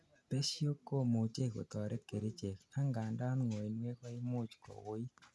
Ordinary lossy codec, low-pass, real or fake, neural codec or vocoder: none; none; real; none